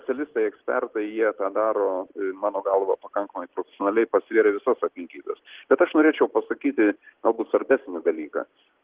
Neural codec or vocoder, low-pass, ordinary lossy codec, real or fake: none; 3.6 kHz; Opus, 16 kbps; real